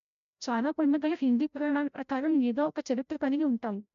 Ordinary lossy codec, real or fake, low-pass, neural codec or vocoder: MP3, 64 kbps; fake; 7.2 kHz; codec, 16 kHz, 0.5 kbps, FreqCodec, larger model